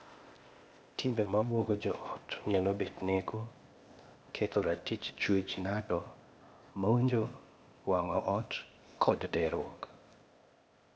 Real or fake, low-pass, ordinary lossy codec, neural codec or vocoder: fake; none; none; codec, 16 kHz, 0.8 kbps, ZipCodec